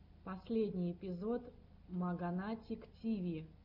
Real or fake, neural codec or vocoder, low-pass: real; none; 5.4 kHz